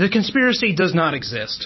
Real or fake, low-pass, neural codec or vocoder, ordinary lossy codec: fake; 7.2 kHz; vocoder, 44.1 kHz, 128 mel bands every 256 samples, BigVGAN v2; MP3, 24 kbps